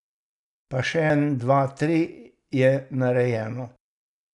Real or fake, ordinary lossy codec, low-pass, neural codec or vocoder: real; none; 10.8 kHz; none